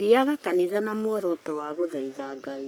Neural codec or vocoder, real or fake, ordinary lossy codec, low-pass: codec, 44.1 kHz, 3.4 kbps, Pupu-Codec; fake; none; none